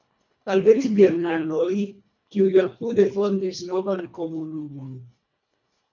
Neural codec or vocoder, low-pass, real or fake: codec, 24 kHz, 1.5 kbps, HILCodec; 7.2 kHz; fake